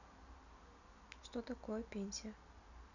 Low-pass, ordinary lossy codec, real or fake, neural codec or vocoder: 7.2 kHz; none; real; none